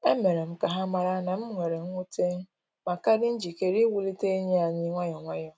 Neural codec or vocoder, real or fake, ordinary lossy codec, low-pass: none; real; none; none